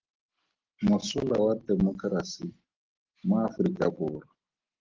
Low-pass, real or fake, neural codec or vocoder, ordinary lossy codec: 7.2 kHz; real; none; Opus, 16 kbps